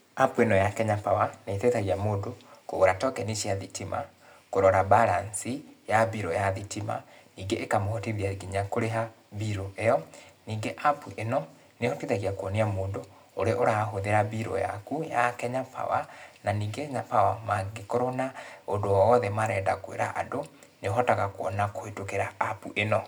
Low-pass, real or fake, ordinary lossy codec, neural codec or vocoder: none; real; none; none